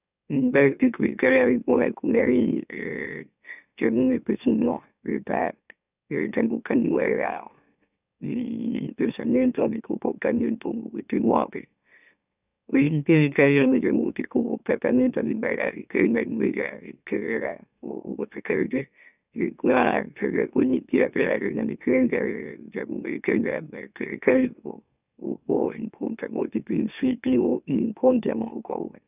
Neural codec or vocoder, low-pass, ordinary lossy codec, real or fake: autoencoder, 44.1 kHz, a latent of 192 numbers a frame, MeloTTS; 3.6 kHz; none; fake